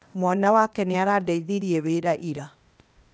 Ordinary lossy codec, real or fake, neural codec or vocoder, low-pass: none; fake; codec, 16 kHz, 0.8 kbps, ZipCodec; none